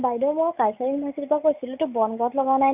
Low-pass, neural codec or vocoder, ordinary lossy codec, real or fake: 3.6 kHz; none; none; real